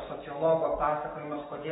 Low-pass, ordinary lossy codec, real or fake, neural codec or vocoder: 19.8 kHz; AAC, 16 kbps; fake; codec, 44.1 kHz, 7.8 kbps, DAC